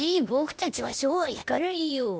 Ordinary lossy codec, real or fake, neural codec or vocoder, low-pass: none; fake; codec, 16 kHz, 0.8 kbps, ZipCodec; none